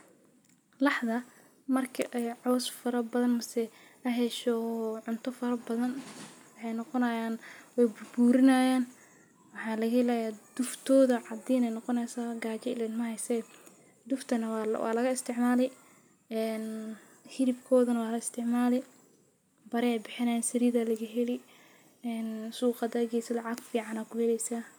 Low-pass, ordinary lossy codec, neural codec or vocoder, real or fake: none; none; none; real